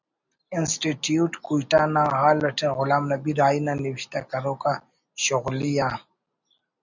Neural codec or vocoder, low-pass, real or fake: none; 7.2 kHz; real